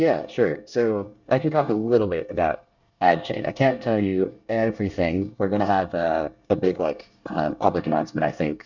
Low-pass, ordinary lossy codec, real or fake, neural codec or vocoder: 7.2 kHz; Opus, 64 kbps; fake; codec, 24 kHz, 1 kbps, SNAC